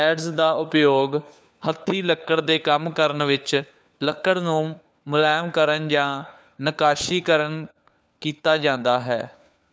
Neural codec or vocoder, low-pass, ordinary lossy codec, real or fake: codec, 16 kHz, 4 kbps, FunCodec, trained on LibriTTS, 50 frames a second; none; none; fake